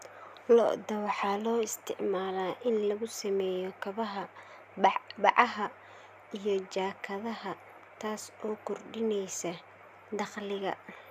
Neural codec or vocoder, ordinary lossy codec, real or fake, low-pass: none; none; real; 14.4 kHz